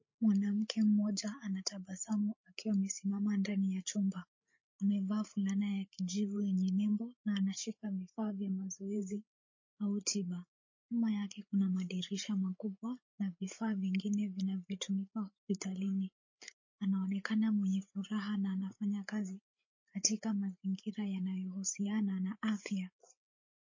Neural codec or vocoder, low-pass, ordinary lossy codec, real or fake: codec, 16 kHz, 8 kbps, FreqCodec, larger model; 7.2 kHz; MP3, 32 kbps; fake